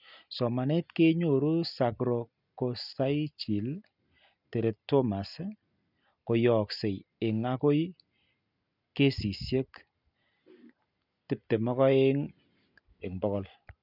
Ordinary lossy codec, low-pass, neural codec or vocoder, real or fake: none; 5.4 kHz; none; real